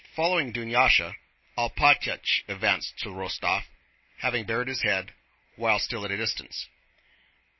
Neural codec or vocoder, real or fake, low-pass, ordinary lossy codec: none; real; 7.2 kHz; MP3, 24 kbps